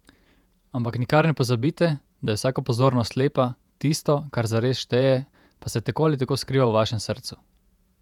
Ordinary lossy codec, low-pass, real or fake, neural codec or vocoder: none; 19.8 kHz; fake; vocoder, 48 kHz, 128 mel bands, Vocos